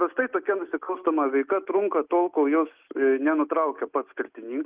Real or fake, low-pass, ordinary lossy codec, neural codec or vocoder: real; 3.6 kHz; Opus, 32 kbps; none